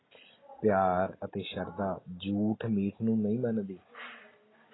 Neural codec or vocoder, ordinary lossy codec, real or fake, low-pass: none; AAC, 16 kbps; real; 7.2 kHz